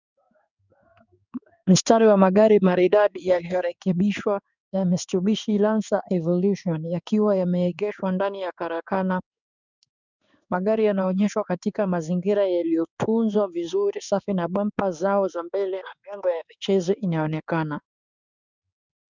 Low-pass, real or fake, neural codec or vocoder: 7.2 kHz; fake; codec, 16 kHz in and 24 kHz out, 1 kbps, XY-Tokenizer